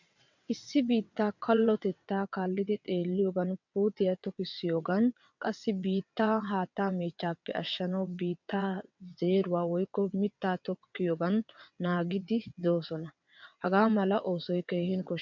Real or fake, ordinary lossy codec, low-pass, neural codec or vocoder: fake; MP3, 64 kbps; 7.2 kHz; vocoder, 22.05 kHz, 80 mel bands, WaveNeXt